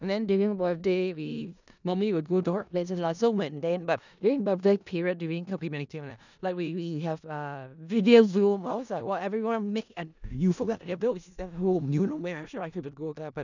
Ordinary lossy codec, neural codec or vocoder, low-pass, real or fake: none; codec, 16 kHz in and 24 kHz out, 0.4 kbps, LongCat-Audio-Codec, four codebook decoder; 7.2 kHz; fake